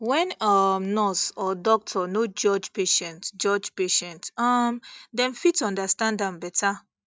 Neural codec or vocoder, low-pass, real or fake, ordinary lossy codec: none; none; real; none